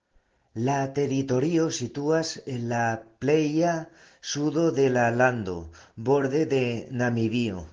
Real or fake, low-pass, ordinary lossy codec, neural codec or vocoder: real; 7.2 kHz; Opus, 16 kbps; none